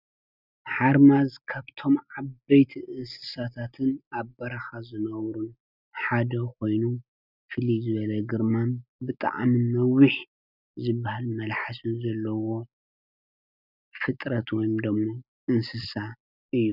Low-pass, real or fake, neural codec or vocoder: 5.4 kHz; real; none